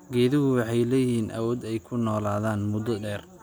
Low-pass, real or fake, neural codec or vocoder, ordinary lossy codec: none; real; none; none